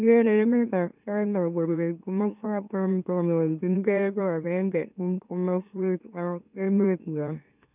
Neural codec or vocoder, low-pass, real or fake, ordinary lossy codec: autoencoder, 44.1 kHz, a latent of 192 numbers a frame, MeloTTS; 3.6 kHz; fake; none